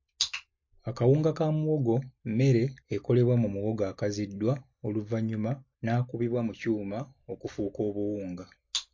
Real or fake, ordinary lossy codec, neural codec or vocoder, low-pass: real; MP3, 48 kbps; none; 7.2 kHz